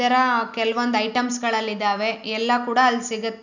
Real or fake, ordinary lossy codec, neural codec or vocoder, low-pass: real; none; none; 7.2 kHz